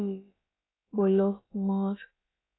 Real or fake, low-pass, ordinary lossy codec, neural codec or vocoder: fake; 7.2 kHz; AAC, 16 kbps; codec, 16 kHz, about 1 kbps, DyCAST, with the encoder's durations